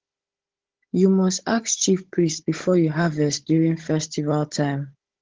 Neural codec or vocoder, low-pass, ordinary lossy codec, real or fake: codec, 16 kHz, 16 kbps, FunCodec, trained on Chinese and English, 50 frames a second; 7.2 kHz; Opus, 16 kbps; fake